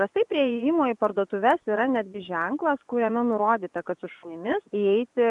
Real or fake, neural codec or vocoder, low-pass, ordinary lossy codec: fake; vocoder, 24 kHz, 100 mel bands, Vocos; 10.8 kHz; MP3, 96 kbps